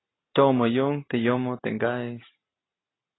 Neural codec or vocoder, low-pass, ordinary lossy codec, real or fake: none; 7.2 kHz; AAC, 16 kbps; real